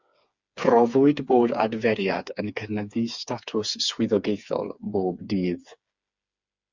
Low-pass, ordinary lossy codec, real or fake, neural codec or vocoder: 7.2 kHz; Opus, 64 kbps; fake; codec, 16 kHz, 4 kbps, FreqCodec, smaller model